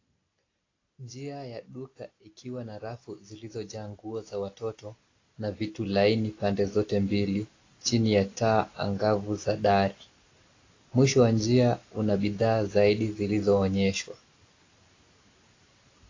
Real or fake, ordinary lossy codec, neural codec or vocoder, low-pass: real; AAC, 32 kbps; none; 7.2 kHz